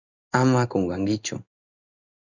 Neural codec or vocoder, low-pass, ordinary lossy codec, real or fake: codec, 16 kHz in and 24 kHz out, 1 kbps, XY-Tokenizer; 7.2 kHz; Opus, 64 kbps; fake